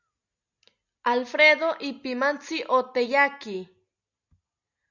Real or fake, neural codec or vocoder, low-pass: real; none; 7.2 kHz